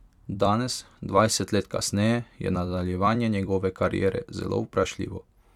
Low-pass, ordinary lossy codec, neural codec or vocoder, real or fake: 19.8 kHz; none; vocoder, 44.1 kHz, 128 mel bands every 256 samples, BigVGAN v2; fake